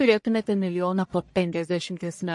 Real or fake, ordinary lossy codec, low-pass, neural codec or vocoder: fake; MP3, 48 kbps; 10.8 kHz; codec, 44.1 kHz, 1.7 kbps, Pupu-Codec